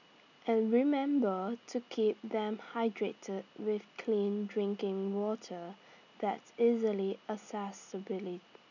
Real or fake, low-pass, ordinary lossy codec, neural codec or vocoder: real; 7.2 kHz; none; none